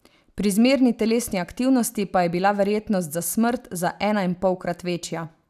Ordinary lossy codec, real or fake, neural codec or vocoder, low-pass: none; real; none; 14.4 kHz